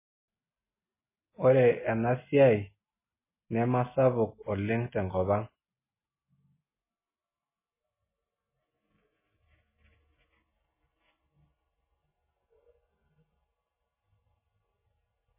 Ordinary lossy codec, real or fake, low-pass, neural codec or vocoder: MP3, 16 kbps; real; 3.6 kHz; none